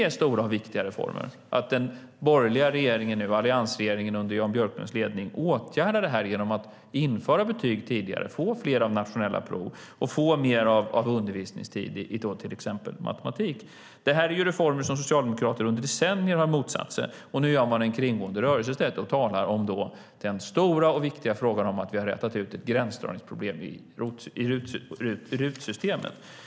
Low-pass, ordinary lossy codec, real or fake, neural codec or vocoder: none; none; real; none